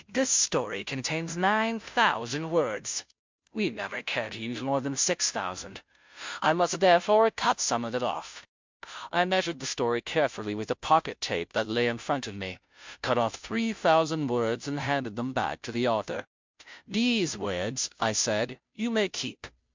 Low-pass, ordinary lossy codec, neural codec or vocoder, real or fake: 7.2 kHz; MP3, 64 kbps; codec, 16 kHz, 0.5 kbps, FunCodec, trained on Chinese and English, 25 frames a second; fake